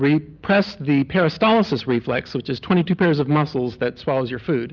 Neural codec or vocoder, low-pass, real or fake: none; 7.2 kHz; real